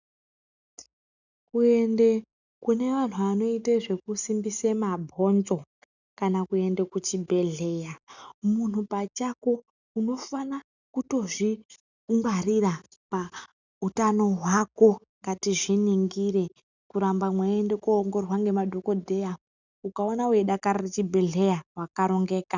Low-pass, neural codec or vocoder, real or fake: 7.2 kHz; none; real